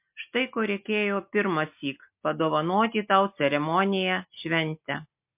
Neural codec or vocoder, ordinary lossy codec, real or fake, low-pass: none; MP3, 32 kbps; real; 3.6 kHz